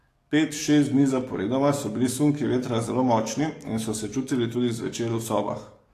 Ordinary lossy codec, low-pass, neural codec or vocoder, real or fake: AAC, 48 kbps; 14.4 kHz; codec, 44.1 kHz, 7.8 kbps, DAC; fake